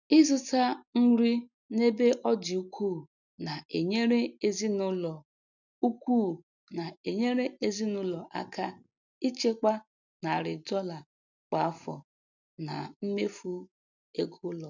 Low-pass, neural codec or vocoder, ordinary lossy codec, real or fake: 7.2 kHz; none; none; real